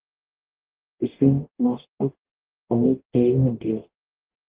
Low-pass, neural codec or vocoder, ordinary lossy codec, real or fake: 3.6 kHz; codec, 44.1 kHz, 0.9 kbps, DAC; Opus, 16 kbps; fake